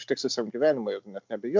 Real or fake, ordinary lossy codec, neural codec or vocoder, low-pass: real; MP3, 64 kbps; none; 7.2 kHz